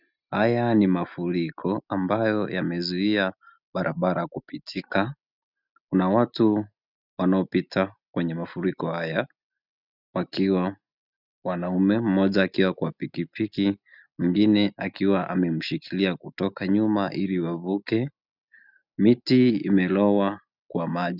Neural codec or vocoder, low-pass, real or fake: none; 5.4 kHz; real